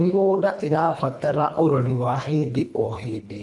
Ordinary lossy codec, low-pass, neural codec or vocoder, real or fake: none; none; codec, 24 kHz, 1.5 kbps, HILCodec; fake